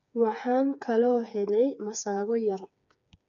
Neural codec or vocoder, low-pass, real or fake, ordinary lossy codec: codec, 16 kHz, 4 kbps, FreqCodec, smaller model; 7.2 kHz; fake; none